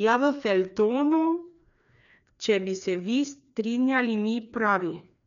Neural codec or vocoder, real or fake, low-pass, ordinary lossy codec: codec, 16 kHz, 2 kbps, FreqCodec, larger model; fake; 7.2 kHz; none